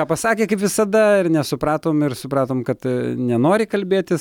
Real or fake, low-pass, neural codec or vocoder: real; 19.8 kHz; none